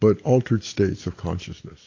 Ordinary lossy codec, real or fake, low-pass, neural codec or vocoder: AAC, 32 kbps; real; 7.2 kHz; none